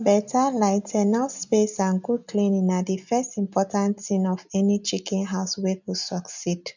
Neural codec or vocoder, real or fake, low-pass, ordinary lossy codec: none; real; 7.2 kHz; none